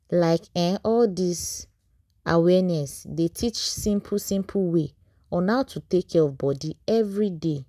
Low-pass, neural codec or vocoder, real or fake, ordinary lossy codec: 14.4 kHz; none; real; none